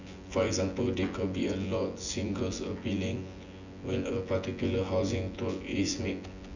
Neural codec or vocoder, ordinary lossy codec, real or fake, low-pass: vocoder, 24 kHz, 100 mel bands, Vocos; none; fake; 7.2 kHz